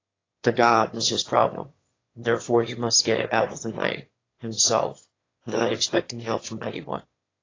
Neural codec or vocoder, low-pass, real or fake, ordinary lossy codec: autoencoder, 22.05 kHz, a latent of 192 numbers a frame, VITS, trained on one speaker; 7.2 kHz; fake; AAC, 32 kbps